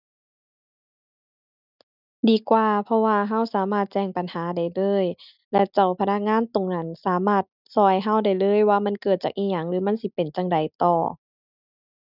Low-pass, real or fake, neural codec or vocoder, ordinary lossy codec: 5.4 kHz; real; none; none